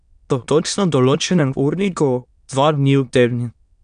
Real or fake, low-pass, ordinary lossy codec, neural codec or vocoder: fake; 9.9 kHz; none; autoencoder, 22.05 kHz, a latent of 192 numbers a frame, VITS, trained on many speakers